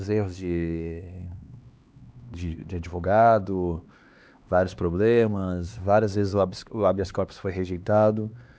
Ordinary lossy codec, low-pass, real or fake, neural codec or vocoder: none; none; fake; codec, 16 kHz, 2 kbps, X-Codec, HuBERT features, trained on LibriSpeech